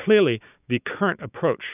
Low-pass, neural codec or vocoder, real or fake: 3.6 kHz; codec, 16 kHz, 6 kbps, DAC; fake